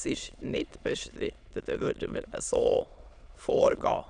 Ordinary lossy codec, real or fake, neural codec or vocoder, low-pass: none; fake; autoencoder, 22.05 kHz, a latent of 192 numbers a frame, VITS, trained on many speakers; 9.9 kHz